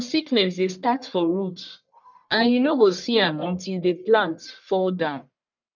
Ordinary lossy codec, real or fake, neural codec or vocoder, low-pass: none; fake; codec, 44.1 kHz, 1.7 kbps, Pupu-Codec; 7.2 kHz